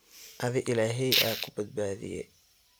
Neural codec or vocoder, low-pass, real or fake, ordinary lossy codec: none; none; real; none